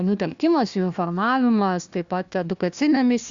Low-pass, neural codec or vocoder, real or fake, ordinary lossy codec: 7.2 kHz; codec, 16 kHz, 1 kbps, FunCodec, trained on Chinese and English, 50 frames a second; fake; Opus, 64 kbps